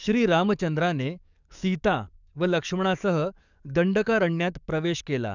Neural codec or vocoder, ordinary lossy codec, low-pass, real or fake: codec, 44.1 kHz, 7.8 kbps, DAC; none; 7.2 kHz; fake